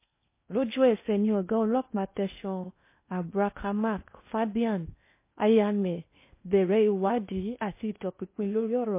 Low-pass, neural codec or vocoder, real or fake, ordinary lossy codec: 3.6 kHz; codec, 16 kHz in and 24 kHz out, 0.8 kbps, FocalCodec, streaming, 65536 codes; fake; MP3, 24 kbps